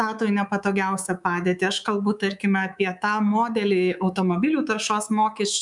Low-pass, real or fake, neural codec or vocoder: 10.8 kHz; fake; codec, 24 kHz, 3.1 kbps, DualCodec